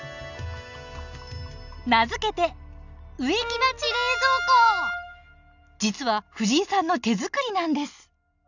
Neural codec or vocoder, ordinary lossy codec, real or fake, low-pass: none; none; real; 7.2 kHz